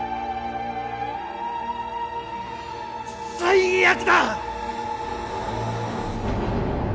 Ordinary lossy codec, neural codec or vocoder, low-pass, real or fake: none; none; none; real